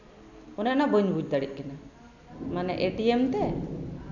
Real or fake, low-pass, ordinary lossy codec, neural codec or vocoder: real; 7.2 kHz; none; none